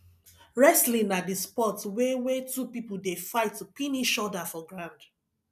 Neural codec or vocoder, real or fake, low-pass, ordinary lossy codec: none; real; 14.4 kHz; none